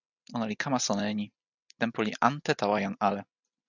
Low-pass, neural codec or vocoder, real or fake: 7.2 kHz; none; real